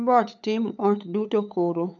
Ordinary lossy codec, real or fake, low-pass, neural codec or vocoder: none; fake; 7.2 kHz; codec, 16 kHz, 8 kbps, FunCodec, trained on LibriTTS, 25 frames a second